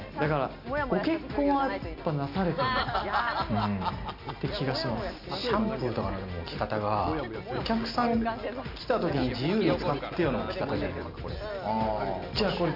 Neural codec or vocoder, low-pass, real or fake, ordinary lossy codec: none; 5.4 kHz; real; none